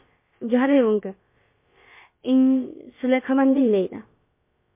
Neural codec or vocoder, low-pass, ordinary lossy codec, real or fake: codec, 16 kHz, about 1 kbps, DyCAST, with the encoder's durations; 3.6 kHz; MP3, 24 kbps; fake